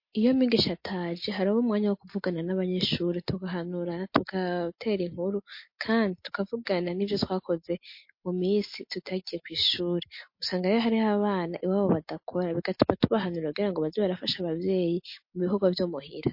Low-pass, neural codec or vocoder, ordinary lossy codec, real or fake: 5.4 kHz; none; MP3, 32 kbps; real